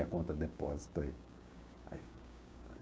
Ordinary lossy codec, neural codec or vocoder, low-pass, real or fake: none; codec, 16 kHz, 6 kbps, DAC; none; fake